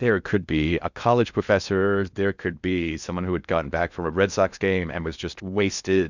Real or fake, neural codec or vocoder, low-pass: fake; codec, 16 kHz in and 24 kHz out, 0.6 kbps, FocalCodec, streaming, 4096 codes; 7.2 kHz